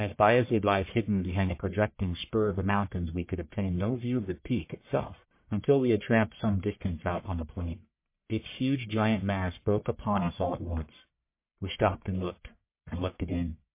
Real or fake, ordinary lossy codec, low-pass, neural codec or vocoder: fake; MP3, 24 kbps; 3.6 kHz; codec, 44.1 kHz, 1.7 kbps, Pupu-Codec